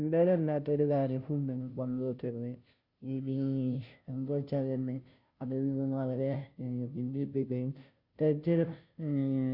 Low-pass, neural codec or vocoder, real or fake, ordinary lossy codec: 5.4 kHz; codec, 16 kHz, 0.5 kbps, FunCodec, trained on Chinese and English, 25 frames a second; fake; Opus, 64 kbps